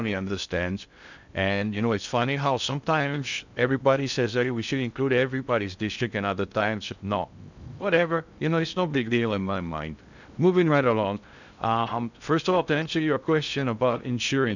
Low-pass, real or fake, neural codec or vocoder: 7.2 kHz; fake; codec, 16 kHz in and 24 kHz out, 0.6 kbps, FocalCodec, streaming, 2048 codes